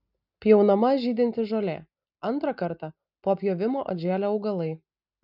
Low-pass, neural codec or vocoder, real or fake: 5.4 kHz; none; real